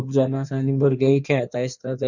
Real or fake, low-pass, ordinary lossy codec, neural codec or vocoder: fake; 7.2 kHz; none; codec, 16 kHz in and 24 kHz out, 1.1 kbps, FireRedTTS-2 codec